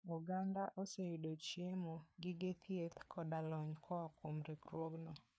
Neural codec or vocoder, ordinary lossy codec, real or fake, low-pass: codec, 16 kHz, 4 kbps, FreqCodec, larger model; none; fake; none